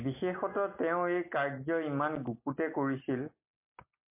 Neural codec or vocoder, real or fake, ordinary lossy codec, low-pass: none; real; MP3, 24 kbps; 3.6 kHz